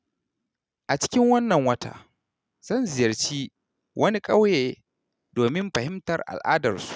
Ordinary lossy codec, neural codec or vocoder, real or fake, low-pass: none; none; real; none